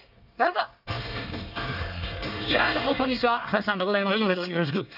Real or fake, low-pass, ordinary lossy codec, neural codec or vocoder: fake; 5.4 kHz; none; codec, 24 kHz, 1 kbps, SNAC